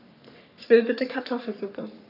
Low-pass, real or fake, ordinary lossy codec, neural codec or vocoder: 5.4 kHz; fake; none; codec, 44.1 kHz, 3.4 kbps, Pupu-Codec